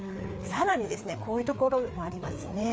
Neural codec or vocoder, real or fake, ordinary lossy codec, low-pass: codec, 16 kHz, 4 kbps, FreqCodec, larger model; fake; none; none